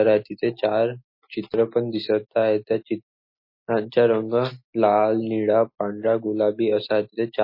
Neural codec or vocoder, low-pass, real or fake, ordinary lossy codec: none; 5.4 kHz; real; MP3, 24 kbps